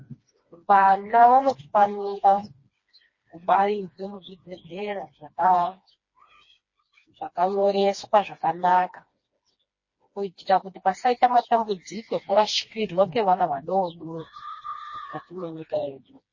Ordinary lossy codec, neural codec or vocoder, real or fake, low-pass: MP3, 32 kbps; codec, 16 kHz, 2 kbps, FreqCodec, smaller model; fake; 7.2 kHz